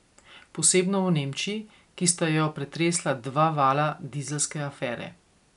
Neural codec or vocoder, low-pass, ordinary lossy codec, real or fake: none; 10.8 kHz; none; real